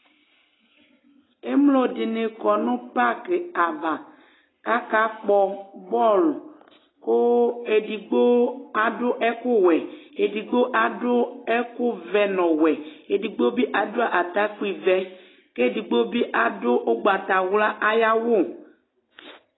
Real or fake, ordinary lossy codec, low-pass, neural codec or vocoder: real; AAC, 16 kbps; 7.2 kHz; none